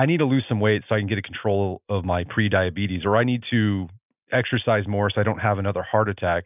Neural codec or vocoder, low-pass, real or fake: none; 3.6 kHz; real